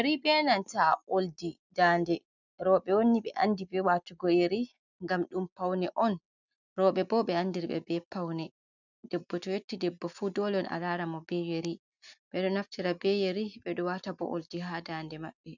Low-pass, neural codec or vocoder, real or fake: 7.2 kHz; none; real